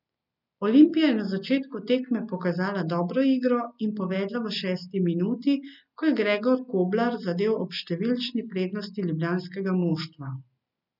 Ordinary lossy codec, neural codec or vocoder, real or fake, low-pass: none; none; real; 5.4 kHz